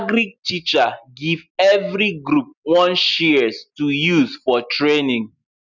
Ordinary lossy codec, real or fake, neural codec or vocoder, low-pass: none; real; none; 7.2 kHz